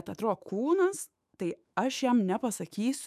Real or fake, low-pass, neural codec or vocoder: fake; 14.4 kHz; autoencoder, 48 kHz, 128 numbers a frame, DAC-VAE, trained on Japanese speech